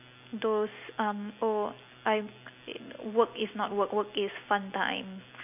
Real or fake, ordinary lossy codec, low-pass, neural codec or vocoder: real; none; 3.6 kHz; none